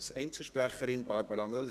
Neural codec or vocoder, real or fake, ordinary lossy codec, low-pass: codec, 32 kHz, 1.9 kbps, SNAC; fake; none; 14.4 kHz